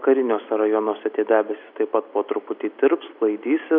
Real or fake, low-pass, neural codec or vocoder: real; 5.4 kHz; none